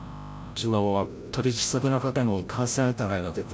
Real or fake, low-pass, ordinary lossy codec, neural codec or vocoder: fake; none; none; codec, 16 kHz, 0.5 kbps, FreqCodec, larger model